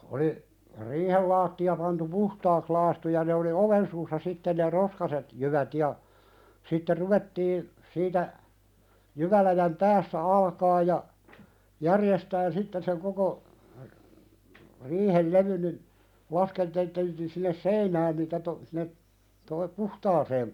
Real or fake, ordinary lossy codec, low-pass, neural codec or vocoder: real; none; 19.8 kHz; none